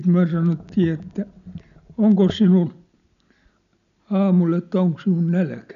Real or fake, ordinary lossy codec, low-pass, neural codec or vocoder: real; none; 7.2 kHz; none